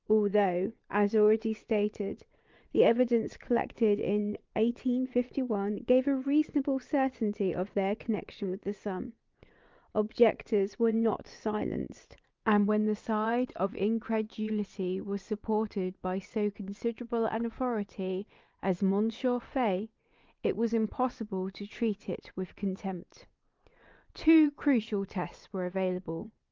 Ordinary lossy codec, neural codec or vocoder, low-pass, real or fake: Opus, 24 kbps; vocoder, 22.05 kHz, 80 mel bands, Vocos; 7.2 kHz; fake